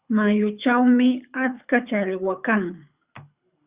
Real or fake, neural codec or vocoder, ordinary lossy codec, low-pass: fake; codec, 24 kHz, 6 kbps, HILCodec; Opus, 64 kbps; 3.6 kHz